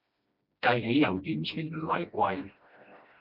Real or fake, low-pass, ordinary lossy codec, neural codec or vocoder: fake; 5.4 kHz; MP3, 48 kbps; codec, 16 kHz, 1 kbps, FreqCodec, smaller model